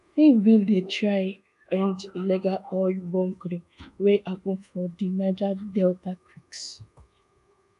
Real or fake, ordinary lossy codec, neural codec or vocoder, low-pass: fake; none; codec, 24 kHz, 1.2 kbps, DualCodec; 10.8 kHz